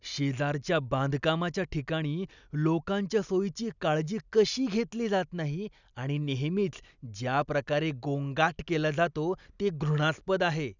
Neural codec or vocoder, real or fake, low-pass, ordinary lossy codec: none; real; 7.2 kHz; none